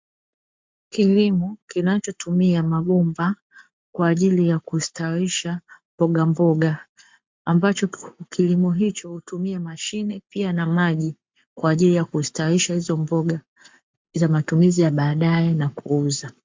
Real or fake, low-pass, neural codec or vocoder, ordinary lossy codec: fake; 7.2 kHz; codec, 44.1 kHz, 7.8 kbps, Pupu-Codec; MP3, 64 kbps